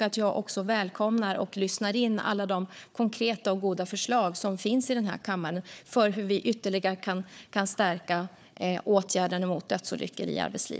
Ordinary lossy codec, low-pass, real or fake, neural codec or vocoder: none; none; fake; codec, 16 kHz, 4 kbps, FunCodec, trained on Chinese and English, 50 frames a second